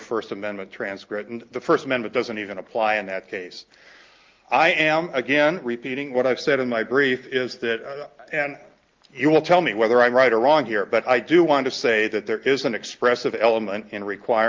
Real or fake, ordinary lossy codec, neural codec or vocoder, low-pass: real; Opus, 32 kbps; none; 7.2 kHz